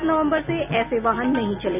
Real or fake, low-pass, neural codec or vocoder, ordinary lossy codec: real; 3.6 kHz; none; none